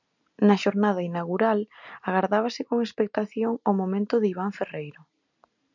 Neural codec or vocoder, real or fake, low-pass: none; real; 7.2 kHz